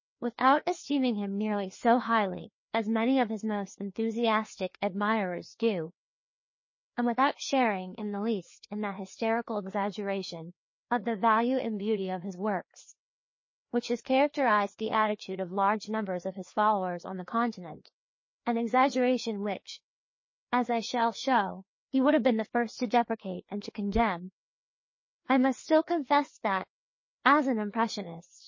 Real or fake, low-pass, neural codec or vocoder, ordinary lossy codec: fake; 7.2 kHz; codec, 16 kHz, 2 kbps, FreqCodec, larger model; MP3, 32 kbps